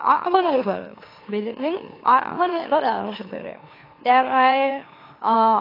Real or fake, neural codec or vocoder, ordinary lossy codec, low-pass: fake; autoencoder, 44.1 kHz, a latent of 192 numbers a frame, MeloTTS; AAC, 24 kbps; 5.4 kHz